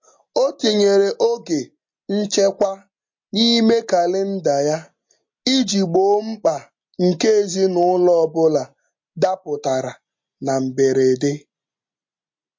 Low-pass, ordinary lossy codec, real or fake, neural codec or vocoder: 7.2 kHz; MP3, 48 kbps; real; none